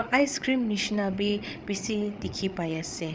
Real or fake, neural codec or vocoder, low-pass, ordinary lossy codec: fake; codec, 16 kHz, 8 kbps, FreqCodec, larger model; none; none